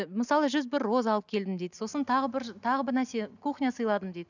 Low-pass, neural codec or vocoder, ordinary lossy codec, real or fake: 7.2 kHz; none; none; real